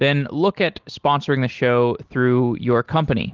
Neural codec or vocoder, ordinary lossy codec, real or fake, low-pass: none; Opus, 16 kbps; real; 7.2 kHz